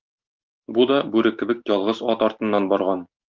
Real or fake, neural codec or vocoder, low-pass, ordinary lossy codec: real; none; 7.2 kHz; Opus, 24 kbps